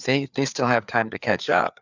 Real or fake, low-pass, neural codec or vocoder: fake; 7.2 kHz; codec, 16 kHz, 4 kbps, FreqCodec, larger model